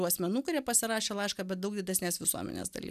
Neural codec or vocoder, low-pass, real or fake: none; 14.4 kHz; real